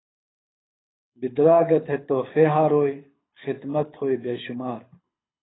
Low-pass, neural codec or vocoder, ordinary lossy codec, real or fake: 7.2 kHz; codec, 24 kHz, 6 kbps, HILCodec; AAC, 16 kbps; fake